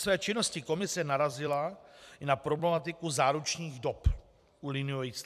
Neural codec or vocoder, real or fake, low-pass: vocoder, 44.1 kHz, 128 mel bands every 256 samples, BigVGAN v2; fake; 14.4 kHz